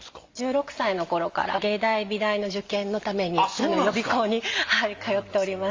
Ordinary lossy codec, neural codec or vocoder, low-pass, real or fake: Opus, 32 kbps; none; 7.2 kHz; real